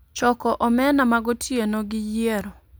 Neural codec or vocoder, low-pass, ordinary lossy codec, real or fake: none; none; none; real